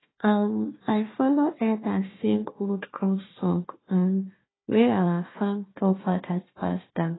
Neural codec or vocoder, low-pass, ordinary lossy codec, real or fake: codec, 16 kHz, 1 kbps, FunCodec, trained on Chinese and English, 50 frames a second; 7.2 kHz; AAC, 16 kbps; fake